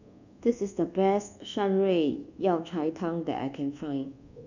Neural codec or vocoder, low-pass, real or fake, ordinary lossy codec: codec, 24 kHz, 1.2 kbps, DualCodec; 7.2 kHz; fake; none